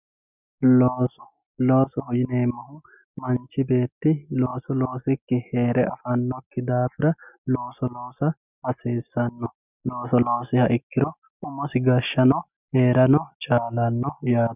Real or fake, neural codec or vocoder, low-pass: real; none; 3.6 kHz